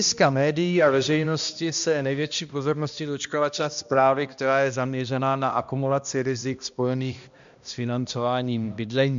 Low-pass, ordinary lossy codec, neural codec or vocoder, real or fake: 7.2 kHz; MP3, 64 kbps; codec, 16 kHz, 1 kbps, X-Codec, HuBERT features, trained on balanced general audio; fake